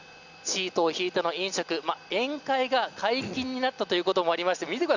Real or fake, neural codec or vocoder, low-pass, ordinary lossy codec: real; none; 7.2 kHz; none